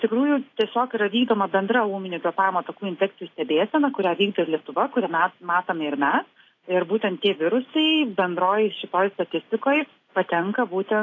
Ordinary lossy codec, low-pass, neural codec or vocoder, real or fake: AAC, 32 kbps; 7.2 kHz; none; real